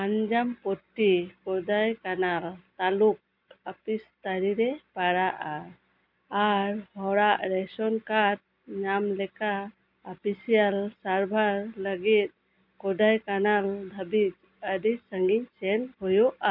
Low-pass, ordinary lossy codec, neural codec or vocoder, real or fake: 5.4 kHz; none; none; real